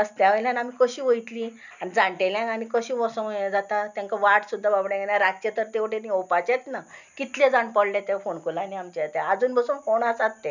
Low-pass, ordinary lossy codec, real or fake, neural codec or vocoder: 7.2 kHz; none; real; none